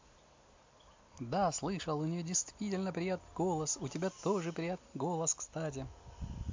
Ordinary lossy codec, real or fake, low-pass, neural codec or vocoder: MP3, 48 kbps; real; 7.2 kHz; none